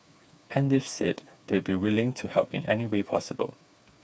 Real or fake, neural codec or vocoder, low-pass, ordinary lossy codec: fake; codec, 16 kHz, 4 kbps, FreqCodec, smaller model; none; none